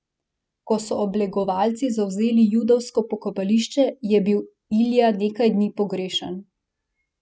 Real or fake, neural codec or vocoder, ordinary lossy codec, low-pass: real; none; none; none